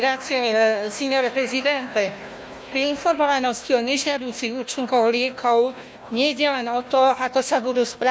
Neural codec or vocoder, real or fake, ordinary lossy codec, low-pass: codec, 16 kHz, 1 kbps, FunCodec, trained on Chinese and English, 50 frames a second; fake; none; none